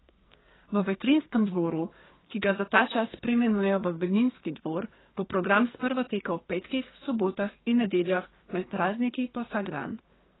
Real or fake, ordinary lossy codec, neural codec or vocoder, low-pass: fake; AAC, 16 kbps; codec, 44.1 kHz, 2.6 kbps, SNAC; 7.2 kHz